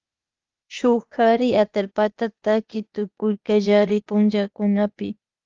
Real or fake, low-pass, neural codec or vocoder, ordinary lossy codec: fake; 7.2 kHz; codec, 16 kHz, 0.8 kbps, ZipCodec; Opus, 32 kbps